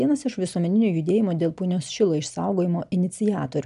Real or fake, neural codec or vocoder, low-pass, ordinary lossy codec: real; none; 10.8 kHz; MP3, 96 kbps